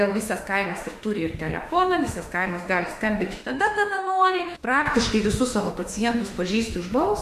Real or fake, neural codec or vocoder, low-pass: fake; autoencoder, 48 kHz, 32 numbers a frame, DAC-VAE, trained on Japanese speech; 14.4 kHz